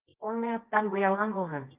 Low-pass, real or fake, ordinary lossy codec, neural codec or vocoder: 3.6 kHz; fake; Opus, 24 kbps; codec, 24 kHz, 0.9 kbps, WavTokenizer, medium music audio release